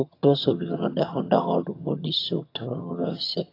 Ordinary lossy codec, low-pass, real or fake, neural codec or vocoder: AAC, 32 kbps; 5.4 kHz; fake; vocoder, 22.05 kHz, 80 mel bands, HiFi-GAN